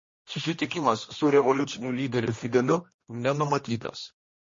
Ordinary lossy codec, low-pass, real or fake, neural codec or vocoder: MP3, 32 kbps; 7.2 kHz; fake; codec, 16 kHz, 1 kbps, X-Codec, HuBERT features, trained on general audio